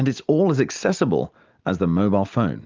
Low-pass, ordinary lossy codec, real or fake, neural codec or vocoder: 7.2 kHz; Opus, 24 kbps; real; none